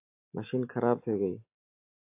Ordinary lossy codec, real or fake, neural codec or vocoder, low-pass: AAC, 24 kbps; real; none; 3.6 kHz